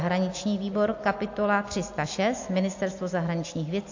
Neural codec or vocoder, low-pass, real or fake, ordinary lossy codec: none; 7.2 kHz; real; AAC, 48 kbps